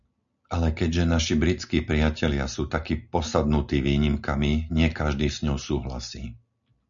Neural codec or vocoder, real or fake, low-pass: none; real; 7.2 kHz